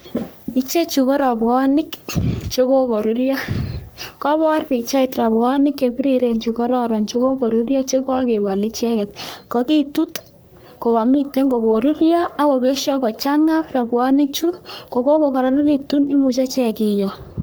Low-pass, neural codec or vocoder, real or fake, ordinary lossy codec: none; codec, 44.1 kHz, 3.4 kbps, Pupu-Codec; fake; none